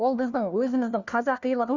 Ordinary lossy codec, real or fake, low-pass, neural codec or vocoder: Opus, 64 kbps; fake; 7.2 kHz; codec, 16 kHz, 1 kbps, FunCodec, trained on LibriTTS, 50 frames a second